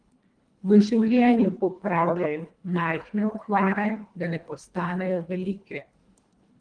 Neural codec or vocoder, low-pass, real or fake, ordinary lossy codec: codec, 24 kHz, 1.5 kbps, HILCodec; 9.9 kHz; fake; Opus, 24 kbps